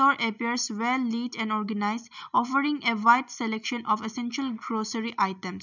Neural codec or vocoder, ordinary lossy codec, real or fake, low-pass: none; none; real; 7.2 kHz